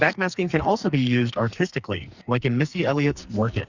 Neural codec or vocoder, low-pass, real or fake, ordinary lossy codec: codec, 44.1 kHz, 2.6 kbps, SNAC; 7.2 kHz; fake; Opus, 64 kbps